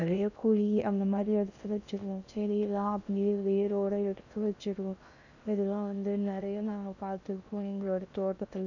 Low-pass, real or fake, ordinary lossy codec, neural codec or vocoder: 7.2 kHz; fake; none; codec, 16 kHz in and 24 kHz out, 0.6 kbps, FocalCodec, streaming, 2048 codes